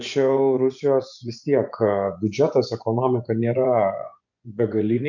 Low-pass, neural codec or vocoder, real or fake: 7.2 kHz; vocoder, 24 kHz, 100 mel bands, Vocos; fake